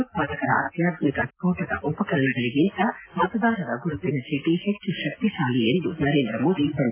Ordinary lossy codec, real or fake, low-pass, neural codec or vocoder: AAC, 24 kbps; real; 3.6 kHz; none